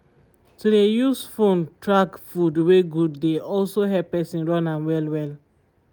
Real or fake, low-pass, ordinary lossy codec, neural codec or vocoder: real; none; none; none